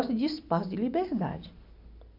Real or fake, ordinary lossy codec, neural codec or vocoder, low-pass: real; MP3, 48 kbps; none; 5.4 kHz